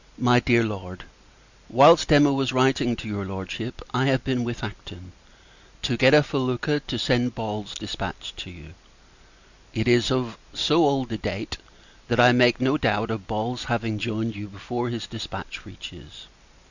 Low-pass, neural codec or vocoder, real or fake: 7.2 kHz; none; real